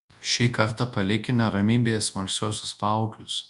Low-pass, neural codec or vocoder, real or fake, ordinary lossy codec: 10.8 kHz; codec, 24 kHz, 0.9 kbps, WavTokenizer, large speech release; fake; Opus, 64 kbps